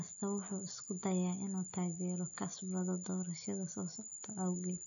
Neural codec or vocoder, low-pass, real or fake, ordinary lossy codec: none; 7.2 kHz; real; AAC, 48 kbps